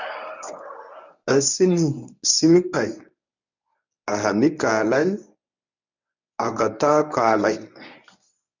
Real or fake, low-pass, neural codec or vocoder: fake; 7.2 kHz; codec, 24 kHz, 0.9 kbps, WavTokenizer, medium speech release version 1